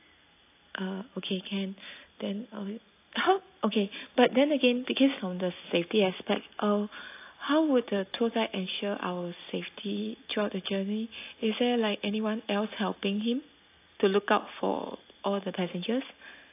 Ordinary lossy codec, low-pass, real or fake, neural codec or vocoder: AAC, 24 kbps; 3.6 kHz; real; none